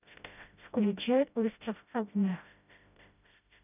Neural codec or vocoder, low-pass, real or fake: codec, 16 kHz, 0.5 kbps, FreqCodec, smaller model; 3.6 kHz; fake